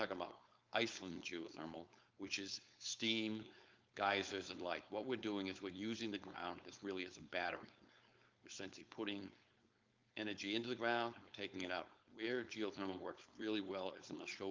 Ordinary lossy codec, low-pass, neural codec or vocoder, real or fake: Opus, 24 kbps; 7.2 kHz; codec, 16 kHz, 4.8 kbps, FACodec; fake